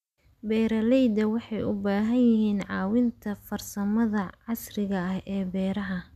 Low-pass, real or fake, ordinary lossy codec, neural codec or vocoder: 14.4 kHz; real; none; none